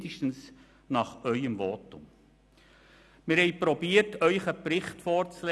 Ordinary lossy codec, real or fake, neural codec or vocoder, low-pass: none; real; none; none